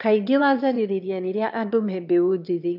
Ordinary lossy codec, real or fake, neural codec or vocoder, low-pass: none; fake; autoencoder, 22.05 kHz, a latent of 192 numbers a frame, VITS, trained on one speaker; 5.4 kHz